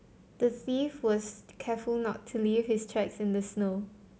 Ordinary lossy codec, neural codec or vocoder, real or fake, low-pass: none; none; real; none